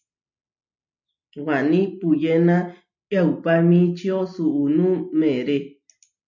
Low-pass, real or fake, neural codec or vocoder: 7.2 kHz; real; none